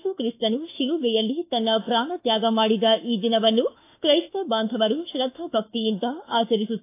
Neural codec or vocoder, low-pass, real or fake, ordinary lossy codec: autoencoder, 48 kHz, 32 numbers a frame, DAC-VAE, trained on Japanese speech; 3.6 kHz; fake; AAC, 24 kbps